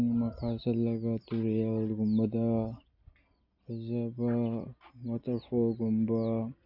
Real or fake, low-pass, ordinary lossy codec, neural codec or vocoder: real; 5.4 kHz; none; none